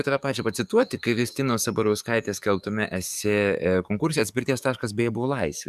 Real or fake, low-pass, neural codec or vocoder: fake; 14.4 kHz; codec, 44.1 kHz, 7.8 kbps, DAC